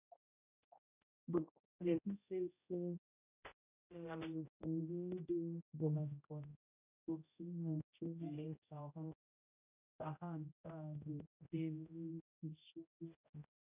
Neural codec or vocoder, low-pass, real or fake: codec, 16 kHz, 0.5 kbps, X-Codec, HuBERT features, trained on general audio; 3.6 kHz; fake